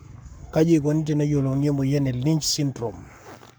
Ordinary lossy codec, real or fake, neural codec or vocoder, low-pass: none; fake; codec, 44.1 kHz, 7.8 kbps, Pupu-Codec; none